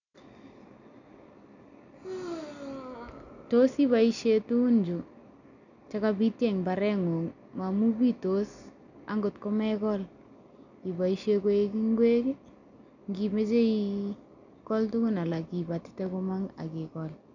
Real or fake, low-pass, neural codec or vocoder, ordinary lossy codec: real; 7.2 kHz; none; none